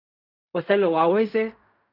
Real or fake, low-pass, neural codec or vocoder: fake; 5.4 kHz; codec, 16 kHz in and 24 kHz out, 0.4 kbps, LongCat-Audio-Codec, fine tuned four codebook decoder